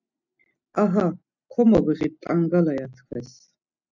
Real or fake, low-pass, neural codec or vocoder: real; 7.2 kHz; none